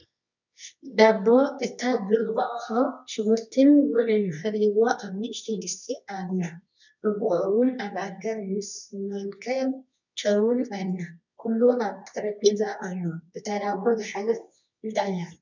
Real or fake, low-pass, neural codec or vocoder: fake; 7.2 kHz; codec, 24 kHz, 0.9 kbps, WavTokenizer, medium music audio release